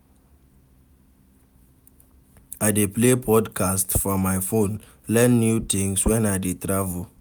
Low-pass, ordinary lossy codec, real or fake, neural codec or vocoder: none; none; real; none